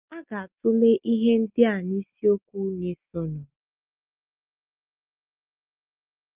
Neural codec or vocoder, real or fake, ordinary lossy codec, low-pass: none; real; Opus, 24 kbps; 3.6 kHz